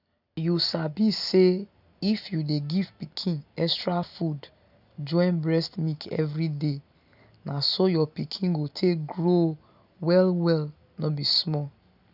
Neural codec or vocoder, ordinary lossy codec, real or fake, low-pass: none; none; real; 5.4 kHz